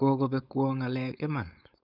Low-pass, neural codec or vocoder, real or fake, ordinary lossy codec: 5.4 kHz; codec, 16 kHz, 4.8 kbps, FACodec; fake; none